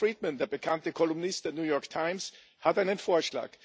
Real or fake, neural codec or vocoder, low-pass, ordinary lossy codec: real; none; none; none